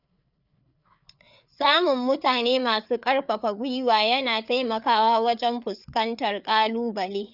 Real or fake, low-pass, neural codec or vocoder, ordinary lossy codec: fake; 5.4 kHz; codec, 16 kHz, 4 kbps, FreqCodec, larger model; none